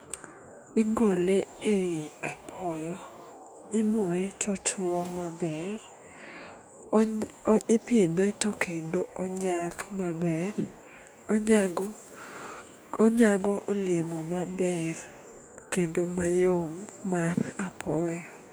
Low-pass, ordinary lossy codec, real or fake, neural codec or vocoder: none; none; fake; codec, 44.1 kHz, 2.6 kbps, DAC